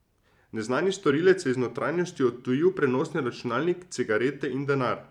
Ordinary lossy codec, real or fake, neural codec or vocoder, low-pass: none; fake; vocoder, 44.1 kHz, 128 mel bands every 256 samples, BigVGAN v2; 19.8 kHz